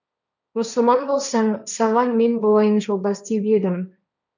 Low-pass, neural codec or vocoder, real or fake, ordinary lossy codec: 7.2 kHz; codec, 16 kHz, 1.1 kbps, Voila-Tokenizer; fake; none